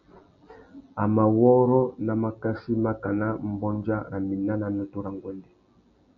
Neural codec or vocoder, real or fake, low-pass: none; real; 7.2 kHz